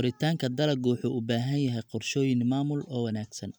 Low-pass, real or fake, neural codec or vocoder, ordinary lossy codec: none; real; none; none